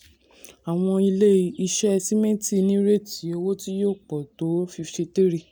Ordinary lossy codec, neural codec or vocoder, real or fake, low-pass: none; none; real; none